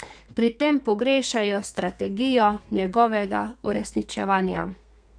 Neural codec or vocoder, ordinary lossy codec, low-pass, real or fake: codec, 32 kHz, 1.9 kbps, SNAC; none; 9.9 kHz; fake